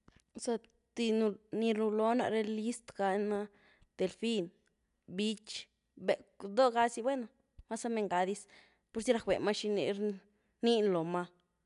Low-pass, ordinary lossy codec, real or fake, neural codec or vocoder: 10.8 kHz; none; real; none